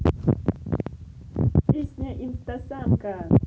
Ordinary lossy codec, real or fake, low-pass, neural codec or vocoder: none; real; none; none